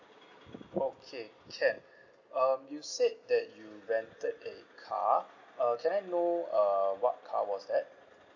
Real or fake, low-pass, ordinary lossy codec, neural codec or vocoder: real; 7.2 kHz; none; none